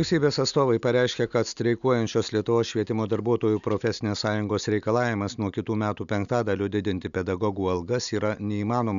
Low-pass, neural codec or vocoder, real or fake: 7.2 kHz; none; real